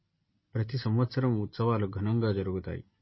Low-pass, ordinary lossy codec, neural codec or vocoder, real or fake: 7.2 kHz; MP3, 24 kbps; none; real